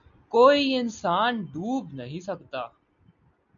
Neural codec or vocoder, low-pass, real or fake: none; 7.2 kHz; real